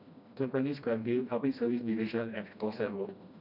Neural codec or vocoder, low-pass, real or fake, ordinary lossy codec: codec, 16 kHz, 1 kbps, FreqCodec, smaller model; 5.4 kHz; fake; none